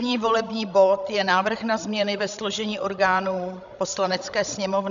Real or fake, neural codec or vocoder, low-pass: fake; codec, 16 kHz, 16 kbps, FreqCodec, larger model; 7.2 kHz